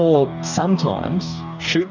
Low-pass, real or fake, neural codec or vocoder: 7.2 kHz; fake; codec, 44.1 kHz, 2.6 kbps, DAC